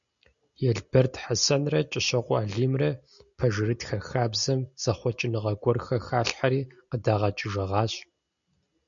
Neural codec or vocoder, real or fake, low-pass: none; real; 7.2 kHz